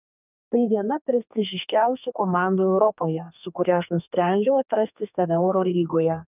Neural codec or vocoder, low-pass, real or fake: codec, 32 kHz, 1.9 kbps, SNAC; 3.6 kHz; fake